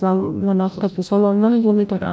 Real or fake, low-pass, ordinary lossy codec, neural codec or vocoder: fake; none; none; codec, 16 kHz, 0.5 kbps, FreqCodec, larger model